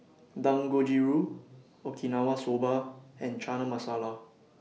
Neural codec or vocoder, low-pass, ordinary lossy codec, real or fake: none; none; none; real